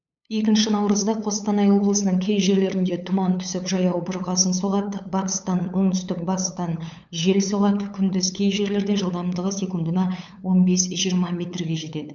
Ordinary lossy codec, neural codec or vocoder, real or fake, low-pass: none; codec, 16 kHz, 8 kbps, FunCodec, trained on LibriTTS, 25 frames a second; fake; 7.2 kHz